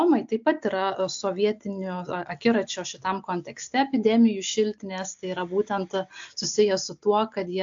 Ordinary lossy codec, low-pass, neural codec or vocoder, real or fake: AAC, 64 kbps; 7.2 kHz; none; real